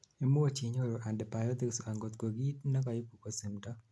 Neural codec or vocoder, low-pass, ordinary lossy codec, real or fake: none; none; none; real